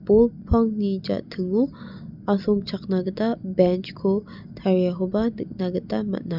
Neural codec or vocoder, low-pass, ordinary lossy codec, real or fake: none; 5.4 kHz; none; real